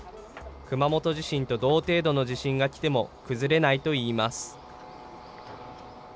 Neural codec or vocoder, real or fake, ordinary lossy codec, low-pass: none; real; none; none